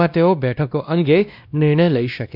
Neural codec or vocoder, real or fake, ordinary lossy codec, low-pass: codec, 16 kHz, 1 kbps, X-Codec, WavLM features, trained on Multilingual LibriSpeech; fake; none; 5.4 kHz